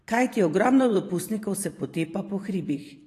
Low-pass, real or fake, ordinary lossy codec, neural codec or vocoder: 14.4 kHz; fake; AAC, 48 kbps; vocoder, 44.1 kHz, 128 mel bands every 512 samples, BigVGAN v2